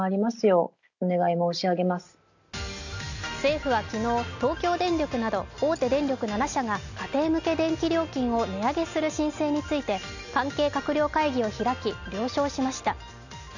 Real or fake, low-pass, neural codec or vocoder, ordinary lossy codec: real; 7.2 kHz; none; none